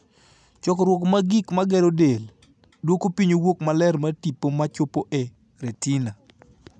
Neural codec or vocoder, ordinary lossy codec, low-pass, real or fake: none; none; none; real